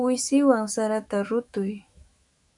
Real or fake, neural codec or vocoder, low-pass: fake; autoencoder, 48 kHz, 128 numbers a frame, DAC-VAE, trained on Japanese speech; 10.8 kHz